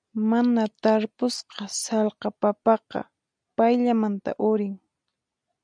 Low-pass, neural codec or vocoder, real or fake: 9.9 kHz; none; real